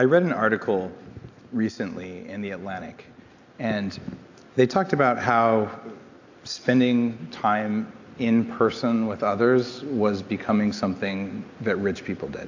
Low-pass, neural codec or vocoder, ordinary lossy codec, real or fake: 7.2 kHz; none; AAC, 48 kbps; real